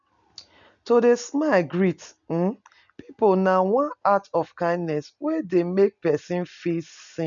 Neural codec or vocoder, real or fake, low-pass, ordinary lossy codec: none; real; 7.2 kHz; none